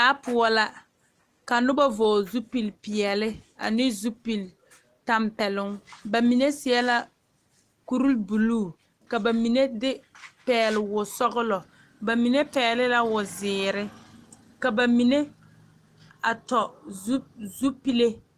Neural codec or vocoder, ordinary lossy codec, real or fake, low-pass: none; Opus, 24 kbps; real; 14.4 kHz